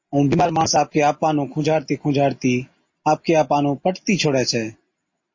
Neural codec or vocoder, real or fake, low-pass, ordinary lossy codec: none; real; 7.2 kHz; MP3, 32 kbps